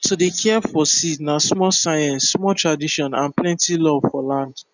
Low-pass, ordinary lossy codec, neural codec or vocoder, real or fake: 7.2 kHz; none; none; real